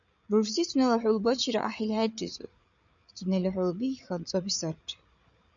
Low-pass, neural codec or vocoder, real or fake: 7.2 kHz; codec, 16 kHz, 8 kbps, FreqCodec, larger model; fake